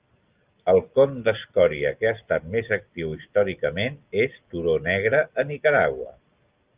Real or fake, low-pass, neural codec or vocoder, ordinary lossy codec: real; 3.6 kHz; none; Opus, 24 kbps